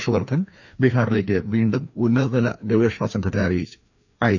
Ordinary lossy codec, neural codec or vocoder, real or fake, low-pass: none; codec, 16 kHz, 2 kbps, FreqCodec, larger model; fake; 7.2 kHz